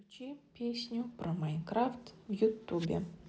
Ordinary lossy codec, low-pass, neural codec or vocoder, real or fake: none; none; none; real